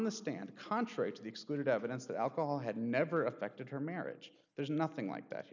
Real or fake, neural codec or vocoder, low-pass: real; none; 7.2 kHz